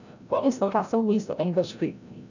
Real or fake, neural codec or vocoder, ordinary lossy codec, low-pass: fake; codec, 16 kHz, 0.5 kbps, FreqCodec, larger model; none; 7.2 kHz